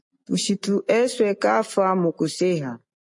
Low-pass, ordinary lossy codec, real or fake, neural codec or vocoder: 10.8 kHz; MP3, 48 kbps; real; none